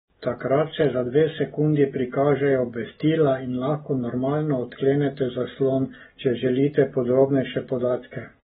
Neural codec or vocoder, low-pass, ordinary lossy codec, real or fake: none; 19.8 kHz; AAC, 16 kbps; real